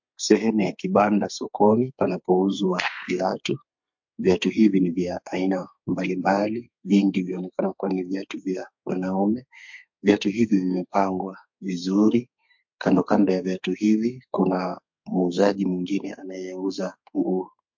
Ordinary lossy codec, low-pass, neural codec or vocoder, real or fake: MP3, 48 kbps; 7.2 kHz; codec, 32 kHz, 1.9 kbps, SNAC; fake